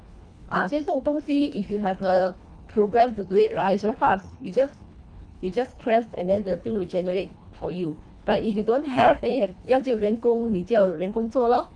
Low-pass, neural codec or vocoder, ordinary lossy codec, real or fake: 9.9 kHz; codec, 24 kHz, 1.5 kbps, HILCodec; none; fake